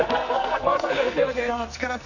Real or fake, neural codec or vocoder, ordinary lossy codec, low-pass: fake; codec, 44.1 kHz, 2.6 kbps, SNAC; none; 7.2 kHz